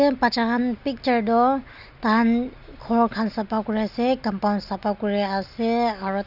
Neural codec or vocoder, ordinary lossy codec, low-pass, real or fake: none; none; 5.4 kHz; real